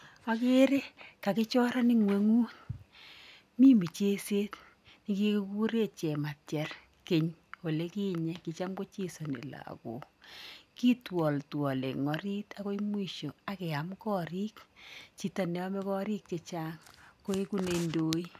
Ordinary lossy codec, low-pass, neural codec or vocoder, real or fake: none; 14.4 kHz; none; real